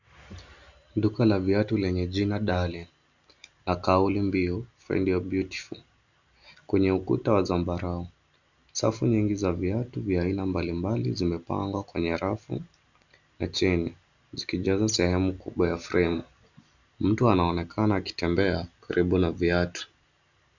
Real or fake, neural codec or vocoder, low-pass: real; none; 7.2 kHz